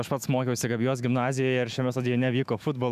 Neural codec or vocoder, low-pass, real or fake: autoencoder, 48 kHz, 128 numbers a frame, DAC-VAE, trained on Japanese speech; 14.4 kHz; fake